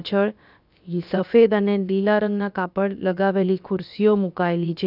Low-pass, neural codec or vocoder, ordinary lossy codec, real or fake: 5.4 kHz; codec, 16 kHz, about 1 kbps, DyCAST, with the encoder's durations; none; fake